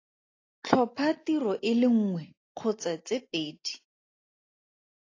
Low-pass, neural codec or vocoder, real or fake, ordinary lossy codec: 7.2 kHz; none; real; AAC, 32 kbps